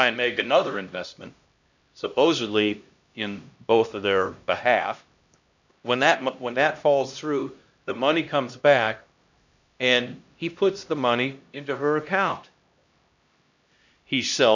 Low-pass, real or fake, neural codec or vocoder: 7.2 kHz; fake; codec, 16 kHz, 1 kbps, X-Codec, HuBERT features, trained on LibriSpeech